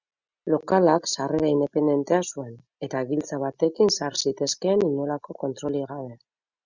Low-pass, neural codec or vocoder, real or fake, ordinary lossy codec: 7.2 kHz; none; real; Opus, 64 kbps